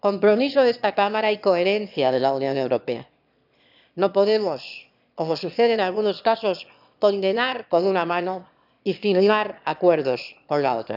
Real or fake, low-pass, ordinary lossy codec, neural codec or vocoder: fake; 5.4 kHz; none; autoencoder, 22.05 kHz, a latent of 192 numbers a frame, VITS, trained on one speaker